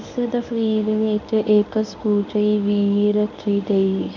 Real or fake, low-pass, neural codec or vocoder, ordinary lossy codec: fake; 7.2 kHz; codec, 24 kHz, 0.9 kbps, WavTokenizer, medium speech release version 1; none